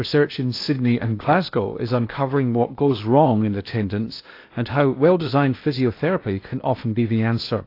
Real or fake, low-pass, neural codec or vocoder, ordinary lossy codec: fake; 5.4 kHz; codec, 16 kHz in and 24 kHz out, 0.8 kbps, FocalCodec, streaming, 65536 codes; AAC, 32 kbps